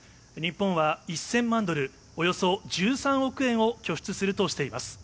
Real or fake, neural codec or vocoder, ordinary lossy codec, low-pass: real; none; none; none